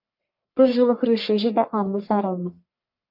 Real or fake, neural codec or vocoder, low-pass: fake; codec, 44.1 kHz, 1.7 kbps, Pupu-Codec; 5.4 kHz